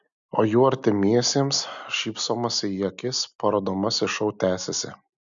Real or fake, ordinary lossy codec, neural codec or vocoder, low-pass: real; MP3, 96 kbps; none; 7.2 kHz